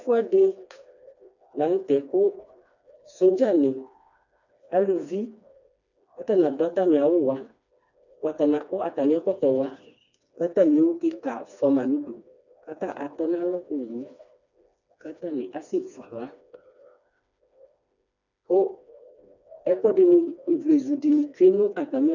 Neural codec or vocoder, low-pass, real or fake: codec, 16 kHz, 2 kbps, FreqCodec, smaller model; 7.2 kHz; fake